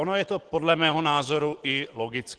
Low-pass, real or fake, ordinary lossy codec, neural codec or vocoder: 9.9 kHz; real; Opus, 16 kbps; none